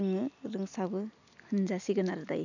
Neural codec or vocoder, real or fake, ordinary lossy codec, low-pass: none; real; none; 7.2 kHz